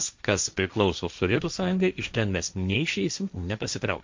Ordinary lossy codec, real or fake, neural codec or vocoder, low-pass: MP3, 64 kbps; fake; codec, 16 kHz, 1.1 kbps, Voila-Tokenizer; 7.2 kHz